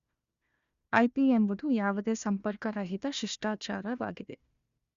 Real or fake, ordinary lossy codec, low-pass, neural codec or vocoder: fake; Opus, 64 kbps; 7.2 kHz; codec, 16 kHz, 1 kbps, FunCodec, trained on Chinese and English, 50 frames a second